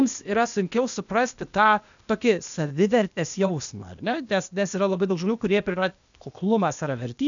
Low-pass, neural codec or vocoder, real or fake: 7.2 kHz; codec, 16 kHz, 0.8 kbps, ZipCodec; fake